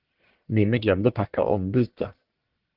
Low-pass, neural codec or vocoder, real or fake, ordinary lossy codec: 5.4 kHz; codec, 44.1 kHz, 1.7 kbps, Pupu-Codec; fake; Opus, 16 kbps